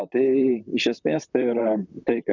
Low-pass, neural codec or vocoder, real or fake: 7.2 kHz; vocoder, 44.1 kHz, 128 mel bands, Pupu-Vocoder; fake